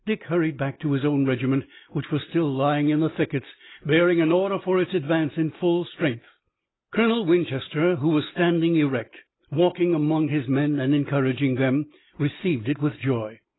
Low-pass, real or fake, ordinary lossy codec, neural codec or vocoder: 7.2 kHz; real; AAC, 16 kbps; none